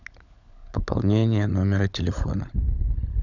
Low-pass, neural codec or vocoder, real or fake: 7.2 kHz; codec, 16 kHz, 16 kbps, FunCodec, trained on LibriTTS, 50 frames a second; fake